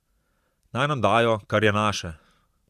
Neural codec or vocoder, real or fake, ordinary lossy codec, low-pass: vocoder, 44.1 kHz, 128 mel bands every 512 samples, BigVGAN v2; fake; Opus, 64 kbps; 14.4 kHz